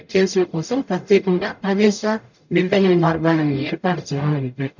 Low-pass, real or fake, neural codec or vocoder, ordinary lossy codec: 7.2 kHz; fake; codec, 44.1 kHz, 0.9 kbps, DAC; none